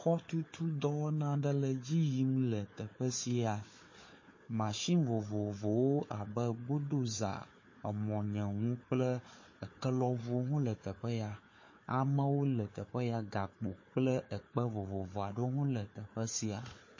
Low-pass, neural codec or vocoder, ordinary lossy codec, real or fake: 7.2 kHz; codec, 16 kHz, 4 kbps, FunCodec, trained on Chinese and English, 50 frames a second; MP3, 32 kbps; fake